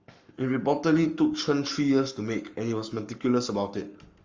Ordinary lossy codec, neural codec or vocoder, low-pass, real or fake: Opus, 32 kbps; codec, 44.1 kHz, 7.8 kbps, Pupu-Codec; 7.2 kHz; fake